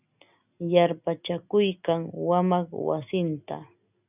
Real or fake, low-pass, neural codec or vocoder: real; 3.6 kHz; none